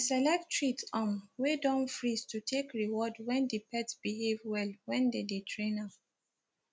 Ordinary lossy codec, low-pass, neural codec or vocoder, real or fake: none; none; none; real